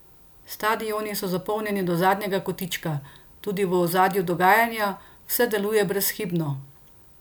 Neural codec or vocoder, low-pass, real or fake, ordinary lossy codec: none; none; real; none